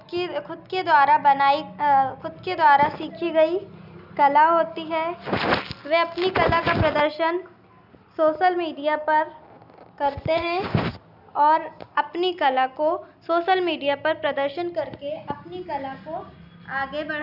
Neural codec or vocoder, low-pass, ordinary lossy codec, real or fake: none; 5.4 kHz; none; real